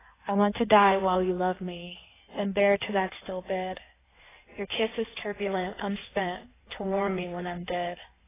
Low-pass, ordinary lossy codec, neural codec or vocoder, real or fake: 3.6 kHz; AAC, 16 kbps; codec, 16 kHz in and 24 kHz out, 1.1 kbps, FireRedTTS-2 codec; fake